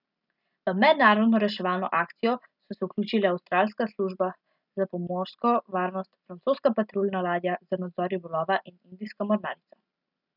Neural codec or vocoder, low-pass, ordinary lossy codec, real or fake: none; 5.4 kHz; none; real